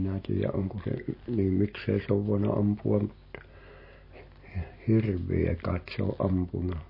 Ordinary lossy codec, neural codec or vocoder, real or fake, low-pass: MP3, 24 kbps; none; real; 5.4 kHz